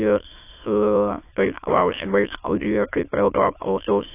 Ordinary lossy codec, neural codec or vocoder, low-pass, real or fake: AAC, 24 kbps; autoencoder, 22.05 kHz, a latent of 192 numbers a frame, VITS, trained on many speakers; 3.6 kHz; fake